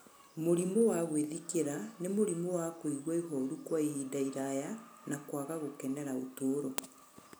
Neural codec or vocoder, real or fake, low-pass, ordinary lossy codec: none; real; none; none